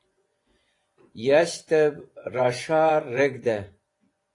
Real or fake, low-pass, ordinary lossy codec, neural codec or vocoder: fake; 10.8 kHz; AAC, 48 kbps; vocoder, 24 kHz, 100 mel bands, Vocos